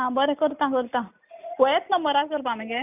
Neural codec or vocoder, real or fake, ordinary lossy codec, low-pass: none; real; none; 3.6 kHz